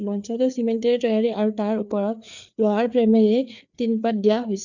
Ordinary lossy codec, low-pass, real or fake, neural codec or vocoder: none; 7.2 kHz; fake; codec, 16 kHz, 4 kbps, FreqCodec, larger model